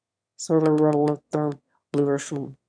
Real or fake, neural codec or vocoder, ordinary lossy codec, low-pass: fake; autoencoder, 22.05 kHz, a latent of 192 numbers a frame, VITS, trained on one speaker; MP3, 96 kbps; 9.9 kHz